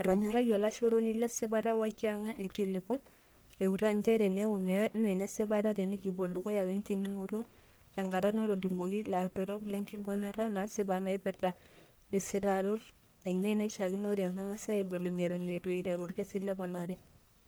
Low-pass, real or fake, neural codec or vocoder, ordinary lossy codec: none; fake; codec, 44.1 kHz, 1.7 kbps, Pupu-Codec; none